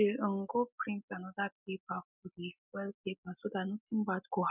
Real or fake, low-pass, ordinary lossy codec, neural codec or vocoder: real; 3.6 kHz; none; none